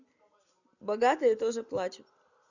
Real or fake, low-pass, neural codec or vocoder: real; 7.2 kHz; none